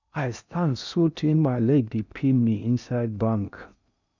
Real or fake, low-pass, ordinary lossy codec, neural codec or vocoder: fake; 7.2 kHz; none; codec, 16 kHz in and 24 kHz out, 0.6 kbps, FocalCodec, streaming, 4096 codes